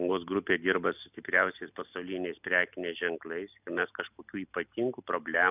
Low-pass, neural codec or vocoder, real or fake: 3.6 kHz; none; real